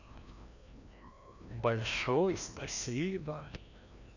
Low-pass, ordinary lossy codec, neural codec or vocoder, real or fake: 7.2 kHz; none; codec, 16 kHz, 1 kbps, FreqCodec, larger model; fake